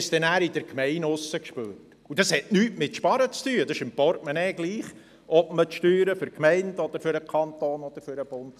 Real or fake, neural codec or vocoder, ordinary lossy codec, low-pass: real; none; none; 14.4 kHz